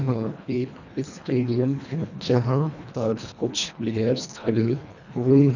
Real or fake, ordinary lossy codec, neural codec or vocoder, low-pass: fake; none; codec, 24 kHz, 1.5 kbps, HILCodec; 7.2 kHz